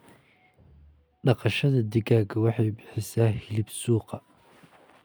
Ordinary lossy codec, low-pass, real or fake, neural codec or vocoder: none; none; real; none